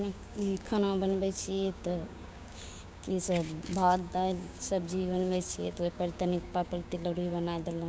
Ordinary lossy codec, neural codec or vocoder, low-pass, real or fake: none; codec, 16 kHz, 6 kbps, DAC; none; fake